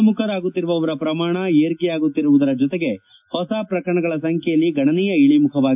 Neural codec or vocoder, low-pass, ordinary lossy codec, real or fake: none; 3.6 kHz; AAC, 32 kbps; real